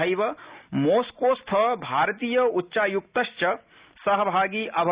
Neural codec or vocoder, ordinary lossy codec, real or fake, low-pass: none; Opus, 64 kbps; real; 3.6 kHz